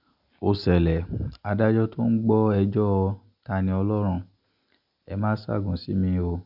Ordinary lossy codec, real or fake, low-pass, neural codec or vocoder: none; real; 5.4 kHz; none